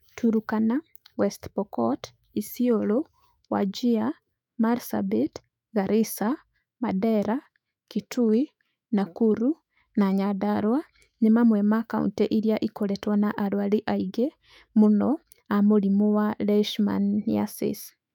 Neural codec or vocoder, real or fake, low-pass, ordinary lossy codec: autoencoder, 48 kHz, 128 numbers a frame, DAC-VAE, trained on Japanese speech; fake; 19.8 kHz; none